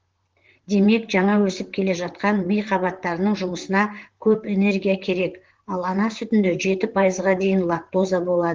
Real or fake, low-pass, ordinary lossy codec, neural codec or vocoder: fake; 7.2 kHz; Opus, 16 kbps; vocoder, 44.1 kHz, 128 mel bands, Pupu-Vocoder